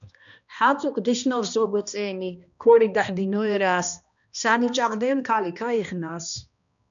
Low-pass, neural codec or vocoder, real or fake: 7.2 kHz; codec, 16 kHz, 1 kbps, X-Codec, HuBERT features, trained on balanced general audio; fake